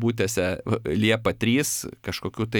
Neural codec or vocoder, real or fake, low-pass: none; real; 19.8 kHz